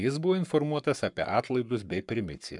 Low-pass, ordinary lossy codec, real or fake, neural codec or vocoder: 10.8 kHz; MP3, 96 kbps; fake; codec, 44.1 kHz, 7.8 kbps, Pupu-Codec